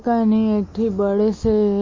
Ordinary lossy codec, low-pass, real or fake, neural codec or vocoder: MP3, 32 kbps; 7.2 kHz; real; none